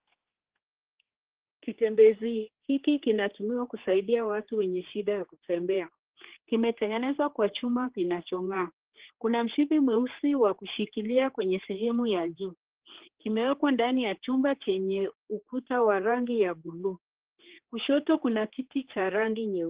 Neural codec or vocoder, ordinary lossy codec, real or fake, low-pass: codec, 16 kHz, 4 kbps, X-Codec, HuBERT features, trained on general audio; Opus, 16 kbps; fake; 3.6 kHz